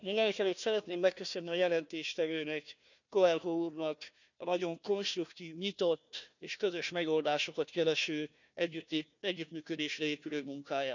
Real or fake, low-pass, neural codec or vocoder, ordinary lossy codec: fake; 7.2 kHz; codec, 16 kHz, 1 kbps, FunCodec, trained on Chinese and English, 50 frames a second; none